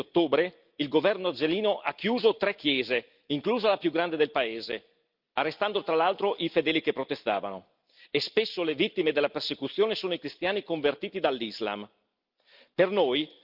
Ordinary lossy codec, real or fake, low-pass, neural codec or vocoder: Opus, 24 kbps; real; 5.4 kHz; none